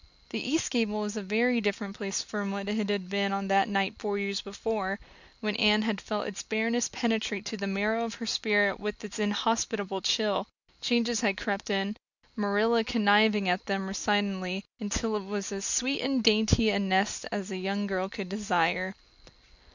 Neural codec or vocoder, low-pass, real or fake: none; 7.2 kHz; real